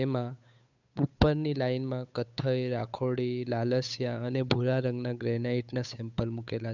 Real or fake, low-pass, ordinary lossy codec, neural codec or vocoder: fake; 7.2 kHz; none; codec, 16 kHz, 8 kbps, FunCodec, trained on Chinese and English, 25 frames a second